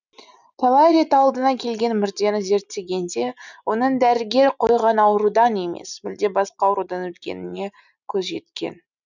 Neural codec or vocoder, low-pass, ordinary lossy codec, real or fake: none; 7.2 kHz; none; real